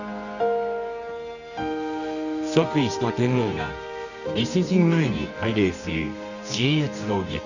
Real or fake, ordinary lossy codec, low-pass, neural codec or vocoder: fake; none; 7.2 kHz; codec, 24 kHz, 0.9 kbps, WavTokenizer, medium music audio release